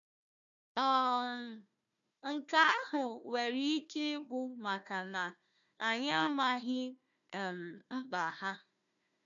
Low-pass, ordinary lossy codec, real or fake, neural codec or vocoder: 7.2 kHz; none; fake; codec, 16 kHz, 1 kbps, FunCodec, trained on Chinese and English, 50 frames a second